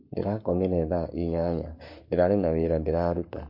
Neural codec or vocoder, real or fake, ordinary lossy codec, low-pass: codec, 44.1 kHz, 7.8 kbps, Pupu-Codec; fake; MP3, 32 kbps; 5.4 kHz